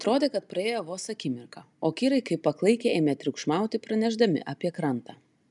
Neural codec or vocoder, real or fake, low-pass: none; real; 10.8 kHz